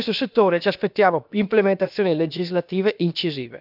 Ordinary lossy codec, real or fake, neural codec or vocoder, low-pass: none; fake; codec, 16 kHz, about 1 kbps, DyCAST, with the encoder's durations; 5.4 kHz